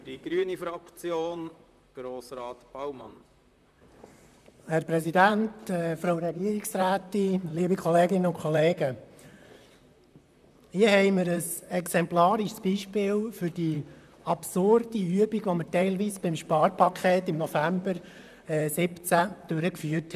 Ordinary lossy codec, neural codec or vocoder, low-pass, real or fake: none; vocoder, 44.1 kHz, 128 mel bands, Pupu-Vocoder; 14.4 kHz; fake